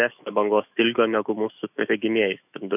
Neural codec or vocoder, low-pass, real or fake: autoencoder, 48 kHz, 128 numbers a frame, DAC-VAE, trained on Japanese speech; 3.6 kHz; fake